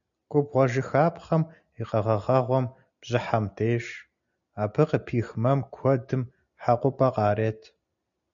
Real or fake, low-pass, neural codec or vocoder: real; 7.2 kHz; none